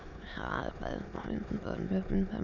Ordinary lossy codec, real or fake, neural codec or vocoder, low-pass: none; fake; autoencoder, 22.05 kHz, a latent of 192 numbers a frame, VITS, trained on many speakers; 7.2 kHz